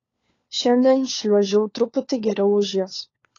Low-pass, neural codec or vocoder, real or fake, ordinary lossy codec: 7.2 kHz; codec, 16 kHz, 4 kbps, FunCodec, trained on LibriTTS, 50 frames a second; fake; AAC, 32 kbps